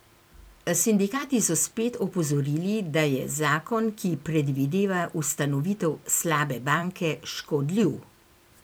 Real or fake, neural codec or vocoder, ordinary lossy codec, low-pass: fake; vocoder, 44.1 kHz, 128 mel bands every 256 samples, BigVGAN v2; none; none